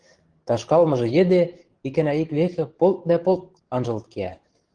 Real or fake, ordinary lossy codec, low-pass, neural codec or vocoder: fake; Opus, 16 kbps; 9.9 kHz; codec, 44.1 kHz, 7.8 kbps, DAC